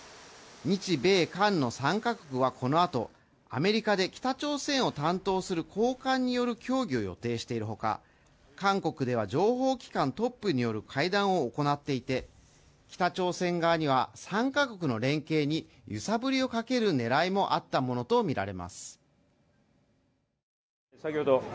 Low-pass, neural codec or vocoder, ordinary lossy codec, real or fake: none; none; none; real